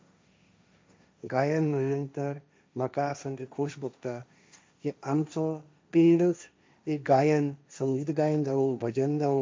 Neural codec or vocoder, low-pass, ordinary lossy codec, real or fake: codec, 16 kHz, 1.1 kbps, Voila-Tokenizer; none; none; fake